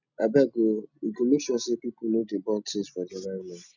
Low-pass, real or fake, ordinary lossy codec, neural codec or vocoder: 7.2 kHz; real; none; none